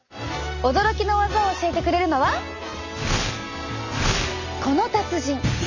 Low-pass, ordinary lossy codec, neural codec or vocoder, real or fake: 7.2 kHz; none; none; real